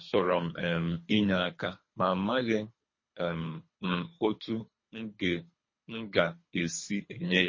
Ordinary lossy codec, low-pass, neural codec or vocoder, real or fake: MP3, 32 kbps; 7.2 kHz; codec, 24 kHz, 3 kbps, HILCodec; fake